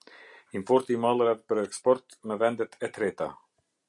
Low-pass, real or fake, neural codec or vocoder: 10.8 kHz; real; none